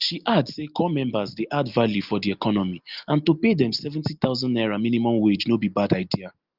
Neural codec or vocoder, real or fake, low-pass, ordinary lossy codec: none; real; 5.4 kHz; Opus, 32 kbps